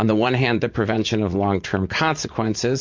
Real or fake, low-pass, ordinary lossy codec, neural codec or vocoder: fake; 7.2 kHz; MP3, 48 kbps; vocoder, 44.1 kHz, 80 mel bands, Vocos